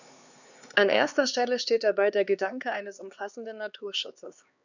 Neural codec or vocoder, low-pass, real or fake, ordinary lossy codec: codec, 16 kHz, 2 kbps, X-Codec, HuBERT features, trained on LibriSpeech; 7.2 kHz; fake; none